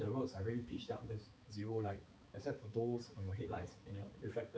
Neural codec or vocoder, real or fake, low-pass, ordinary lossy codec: codec, 16 kHz, 4 kbps, X-Codec, HuBERT features, trained on balanced general audio; fake; none; none